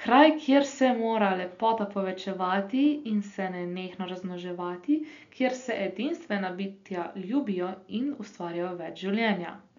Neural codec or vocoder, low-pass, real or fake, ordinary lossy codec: none; 7.2 kHz; real; MP3, 64 kbps